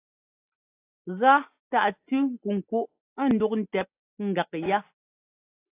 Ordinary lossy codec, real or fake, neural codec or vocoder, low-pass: AAC, 24 kbps; real; none; 3.6 kHz